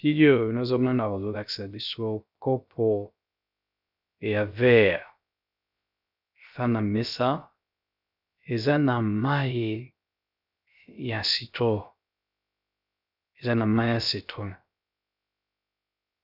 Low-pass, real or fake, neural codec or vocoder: 5.4 kHz; fake; codec, 16 kHz, 0.3 kbps, FocalCodec